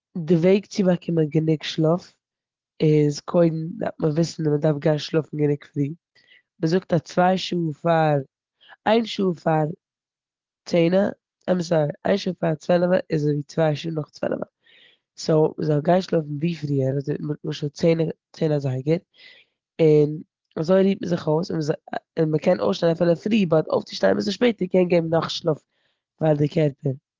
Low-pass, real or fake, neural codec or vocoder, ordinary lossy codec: 7.2 kHz; real; none; Opus, 16 kbps